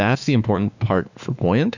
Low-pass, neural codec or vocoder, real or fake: 7.2 kHz; autoencoder, 48 kHz, 32 numbers a frame, DAC-VAE, trained on Japanese speech; fake